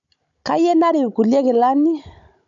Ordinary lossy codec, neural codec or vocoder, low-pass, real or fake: none; codec, 16 kHz, 16 kbps, FunCodec, trained on Chinese and English, 50 frames a second; 7.2 kHz; fake